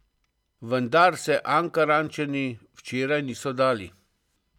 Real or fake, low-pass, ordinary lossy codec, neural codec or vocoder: real; 19.8 kHz; none; none